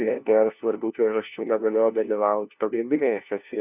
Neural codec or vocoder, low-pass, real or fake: codec, 16 kHz, 1 kbps, FunCodec, trained on LibriTTS, 50 frames a second; 3.6 kHz; fake